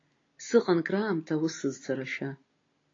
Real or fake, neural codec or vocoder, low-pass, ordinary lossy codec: real; none; 7.2 kHz; AAC, 32 kbps